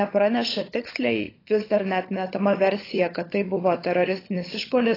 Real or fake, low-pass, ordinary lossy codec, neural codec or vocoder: fake; 5.4 kHz; AAC, 24 kbps; codec, 16 kHz, 16 kbps, FunCodec, trained on LibriTTS, 50 frames a second